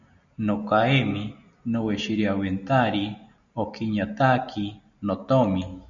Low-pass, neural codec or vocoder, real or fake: 7.2 kHz; none; real